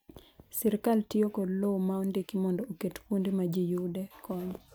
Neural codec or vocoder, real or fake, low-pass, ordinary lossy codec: none; real; none; none